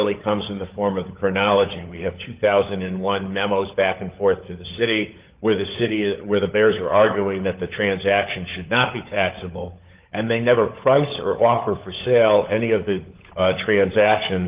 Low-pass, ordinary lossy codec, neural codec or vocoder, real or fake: 3.6 kHz; Opus, 32 kbps; codec, 16 kHz, 16 kbps, FunCodec, trained on LibriTTS, 50 frames a second; fake